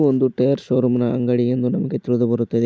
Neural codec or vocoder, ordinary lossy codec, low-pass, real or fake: none; none; none; real